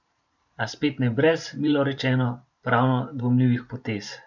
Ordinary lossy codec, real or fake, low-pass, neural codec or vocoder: none; fake; 7.2 kHz; vocoder, 44.1 kHz, 128 mel bands every 512 samples, BigVGAN v2